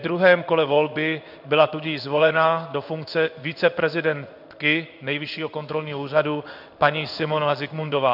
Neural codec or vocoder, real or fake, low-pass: codec, 16 kHz in and 24 kHz out, 1 kbps, XY-Tokenizer; fake; 5.4 kHz